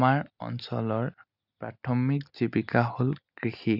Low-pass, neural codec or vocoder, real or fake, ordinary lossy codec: 5.4 kHz; none; real; none